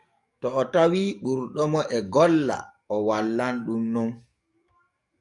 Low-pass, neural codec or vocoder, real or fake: 10.8 kHz; codec, 44.1 kHz, 7.8 kbps, DAC; fake